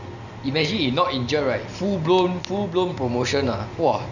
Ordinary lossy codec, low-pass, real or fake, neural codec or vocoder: Opus, 64 kbps; 7.2 kHz; real; none